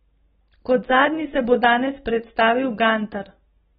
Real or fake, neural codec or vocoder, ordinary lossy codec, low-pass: real; none; AAC, 16 kbps; 19.8 kHz